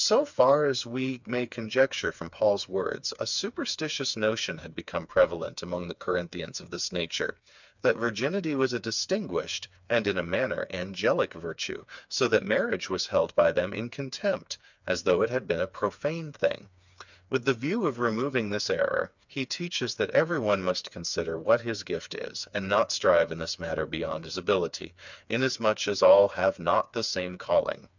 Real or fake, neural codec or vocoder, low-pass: fake; codec, 16 kHz, 4 kbps, FreqCodec, smaller model; 7.2 kHz